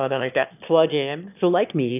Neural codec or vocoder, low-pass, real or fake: autoencoder, 22.05 kHz, a latent of 192 numbers a frame, VITS, trained on one speaker; 3.6 kHz; fake